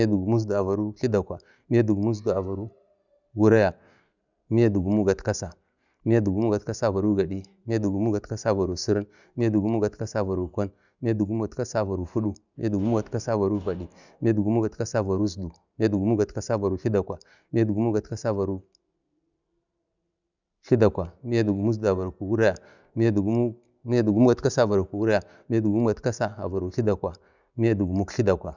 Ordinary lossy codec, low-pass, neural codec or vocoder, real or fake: none; 7.2 kHz; none; real